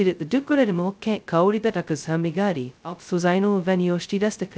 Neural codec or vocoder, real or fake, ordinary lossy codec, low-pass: codec, 16 kHz, 0.2 kbps, FocalCodec; fake; none; none